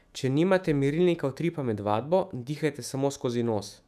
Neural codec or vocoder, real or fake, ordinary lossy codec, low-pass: autoencoder, 48 kHz, 128 numbers a frame, DAC-VAE, trained on Japanese speech; fake; none; 14.4 kHz